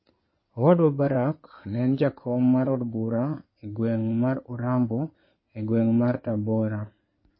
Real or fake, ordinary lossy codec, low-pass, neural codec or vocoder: fake; MP3, 24 kbps; 7.2 kHz; codec, 16 kHz in and 24 kHz out, 2.2 kbps, FireRedTTS-2 codec